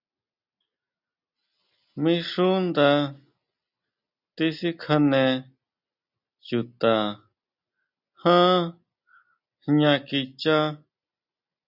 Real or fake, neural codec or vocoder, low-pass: real; none; 5.4 kHz